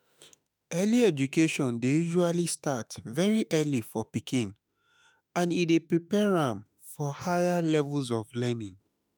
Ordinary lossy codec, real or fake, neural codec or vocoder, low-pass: none; fake; autoencoder, 48 kHz, 32 numbers a frame, DAC-VAE, trained on Japanese speech; none